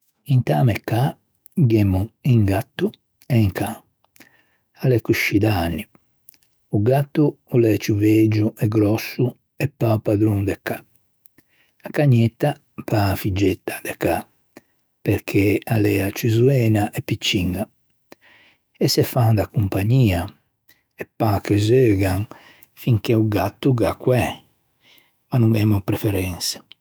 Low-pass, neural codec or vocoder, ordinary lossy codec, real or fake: none; autoencoder, 48 kHz, 128 numbers a frame, DAC-VAE, trained on Japanese speech; none; fake